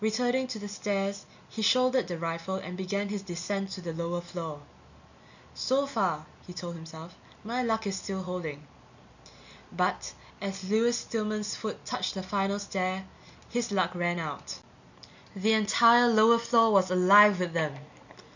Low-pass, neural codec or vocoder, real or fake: 7.2 kHz; none; real